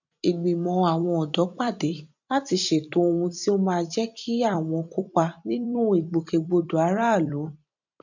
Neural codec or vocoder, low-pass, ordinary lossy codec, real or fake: vocoder, 44.1 kHz, 128 mel bands every 256 samples, BigVGAN v2; 7.2 kHz; none; fake